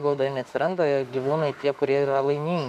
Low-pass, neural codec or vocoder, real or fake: 14.4 kHz; autoencoder, 48 kHz, 32 numbers a frame, DAC-VAE, trained on Japanese speech; fake